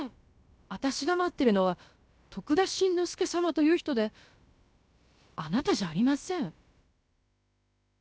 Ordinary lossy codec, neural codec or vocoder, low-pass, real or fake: none; codec, 16 kHz, about 1 kbps, DyCAST, with the encoder's durations; none; fake